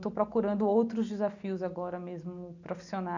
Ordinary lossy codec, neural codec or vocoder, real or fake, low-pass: none; none; real; 7.2 kHz